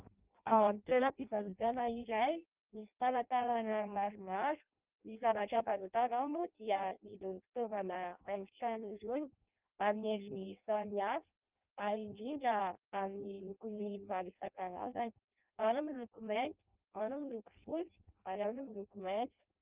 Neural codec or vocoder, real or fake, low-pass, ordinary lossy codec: codec, 16 kHz in and 24 kHz out, 0.6 kbps, FireRedTTS-2 codec; fake; 3.6 kHz; Opus, 24 kbps